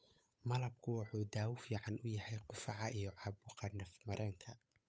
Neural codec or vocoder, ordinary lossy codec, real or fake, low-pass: codec, 16 kHz, 8 kbps, FunCodec, trained on Chinese and English, 25 frames a second; none; fake; none